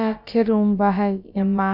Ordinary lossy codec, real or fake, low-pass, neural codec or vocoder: none; fake; 5.4 kHz; codec, 16 kHz, about 1 kbps, DyCAST, with the encoder's durations